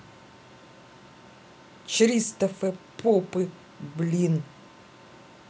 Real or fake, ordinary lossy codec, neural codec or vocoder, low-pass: real; none; none; none